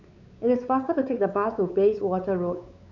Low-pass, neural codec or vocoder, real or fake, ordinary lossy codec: 7.2 kHz; codec, 16 kHz, 4 kbps, X-Codec, WavLM features, trained on Multilingual LibriSpeech; fake; none